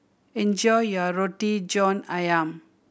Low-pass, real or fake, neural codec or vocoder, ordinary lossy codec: none; real; none; none